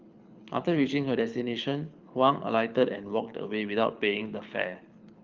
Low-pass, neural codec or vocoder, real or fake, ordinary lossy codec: 7.2 kHz; codec, 24 kHz, 6 kbps, HILCodec; fake; Opus, 32 kbps